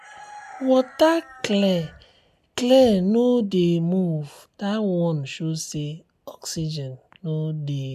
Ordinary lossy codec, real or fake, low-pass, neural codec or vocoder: none; real; 14.4 kHz; none